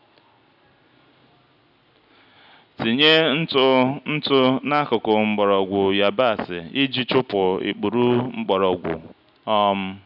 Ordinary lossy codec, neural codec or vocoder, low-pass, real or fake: none; none; 5.4 kHz; real